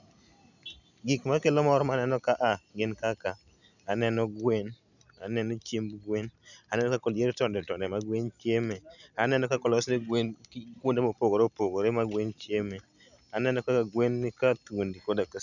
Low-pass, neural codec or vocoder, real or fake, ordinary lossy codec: 7.2 kHz; none; real; none